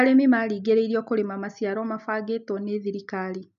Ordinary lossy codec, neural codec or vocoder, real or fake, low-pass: none; none; real; 7.2 kHz